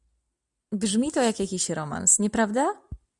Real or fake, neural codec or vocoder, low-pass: real; none; 10.8 kHz